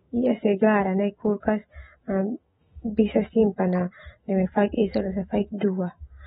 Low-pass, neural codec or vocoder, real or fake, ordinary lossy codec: 19.8 kHz; autoencoder, 48 kHz, 128 numbers a frame, DAC-VAE, trained on Japanese speech; fake; AAC, 16 kbps